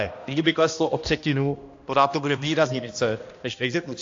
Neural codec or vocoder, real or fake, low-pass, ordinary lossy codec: codec, 16 kHz, 1 kbps, X-Codec, HuBERT features, trained on balanced general audio; fake; 7.2 kHz; AAC, 48 kbps